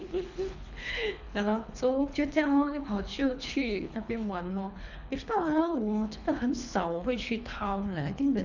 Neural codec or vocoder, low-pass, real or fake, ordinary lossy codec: codec, 24 kHz, 3 kbps, HILCodec; 7.2 kHz; fake; none